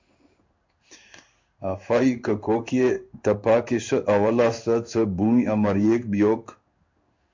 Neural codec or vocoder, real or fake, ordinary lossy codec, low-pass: codec, 16 kHz in and 24 kHz out, 1 kbps, XY-Tokenizer; fake; MP3, 64 kbps; 7.2 kHz